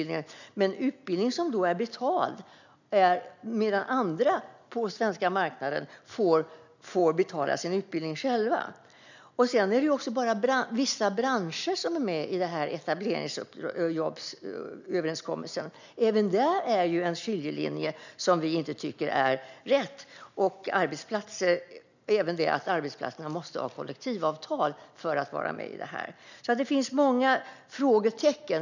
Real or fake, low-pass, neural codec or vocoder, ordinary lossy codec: real; 7.2 kHz; none; none